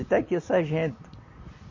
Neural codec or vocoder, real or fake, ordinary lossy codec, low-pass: vocoder, 44.1 kHz, 128 mel bands every 256 samples, BigVGAN v2; fake; MP3, 32 kbps; 7.2 kHz